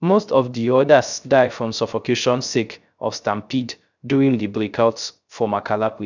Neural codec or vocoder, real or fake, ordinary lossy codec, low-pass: codec, 16 kHz, 0.3 kbps, FocalCodec; fake; none; 7.2 kHz